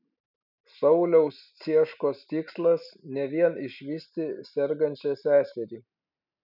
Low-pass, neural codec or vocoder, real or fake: 5.4 kHz; none; real